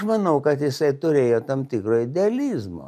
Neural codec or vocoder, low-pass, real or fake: none; 14.4 kHz; real